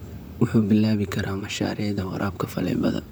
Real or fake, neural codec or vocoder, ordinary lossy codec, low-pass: fake; vocoder, 44.1 kHz, 128 mel bands, Pupu-Vocoder; none; none